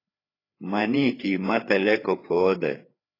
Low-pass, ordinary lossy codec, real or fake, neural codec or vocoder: 5.4 kHz; AAC, 24 kbps; fake; codec, 16 kHz, 4 kbps, FreqCodec, larger model